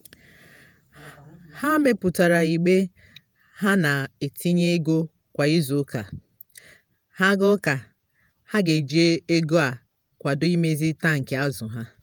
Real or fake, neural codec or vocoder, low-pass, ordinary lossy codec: fake; vocoder, 48 kHz, 128 mel bands, Vocos; none; none